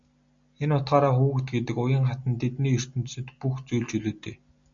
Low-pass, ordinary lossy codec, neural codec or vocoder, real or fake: 7.2 kHz; MP3, 64 kbps; none; real